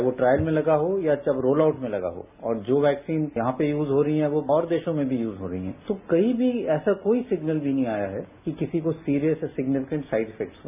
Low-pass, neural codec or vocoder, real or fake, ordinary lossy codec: 3.6 kHz; none; real; none